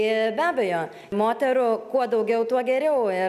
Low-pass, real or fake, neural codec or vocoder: 14.4 kHz; real; none